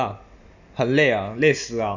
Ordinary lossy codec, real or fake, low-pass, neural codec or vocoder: none; real; 7.2 kHz; none